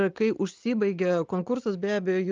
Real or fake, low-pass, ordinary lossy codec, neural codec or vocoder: real; 7.2 kHz; Opus, 32 kbps; none